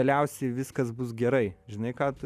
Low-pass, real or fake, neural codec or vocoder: 14.4 kHz; real; none